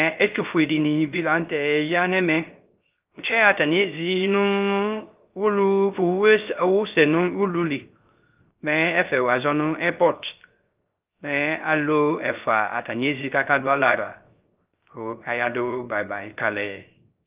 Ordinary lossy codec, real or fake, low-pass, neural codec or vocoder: Opus, 24 kbps; fake; 3.6 kHz; codec, 16 kHz, 0.3 kbps, FocalCodec